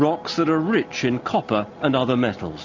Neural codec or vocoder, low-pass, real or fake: none; 7.2 kHz; real